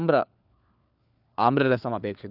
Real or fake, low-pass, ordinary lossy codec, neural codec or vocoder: fake; 5.4 kHz; none; codec, 16 kHz, 4 kbps, FunCodec, trained on LibriTTS, 50 frames a second